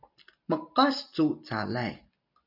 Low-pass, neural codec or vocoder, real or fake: 5.4 kHz; none; real